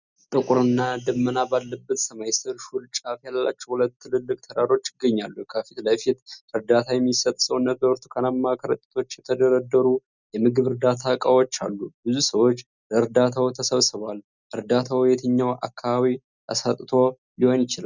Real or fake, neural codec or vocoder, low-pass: real; none; 7.2 kHz